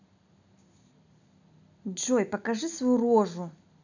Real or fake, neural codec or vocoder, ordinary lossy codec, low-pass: real; none; none; 7.2 kHz